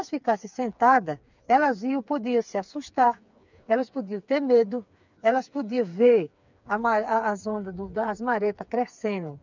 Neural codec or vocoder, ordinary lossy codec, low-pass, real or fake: codec, 16 kHz, 4 kbps, FreqCodec, smaller model; none; 7.2 kHz; fake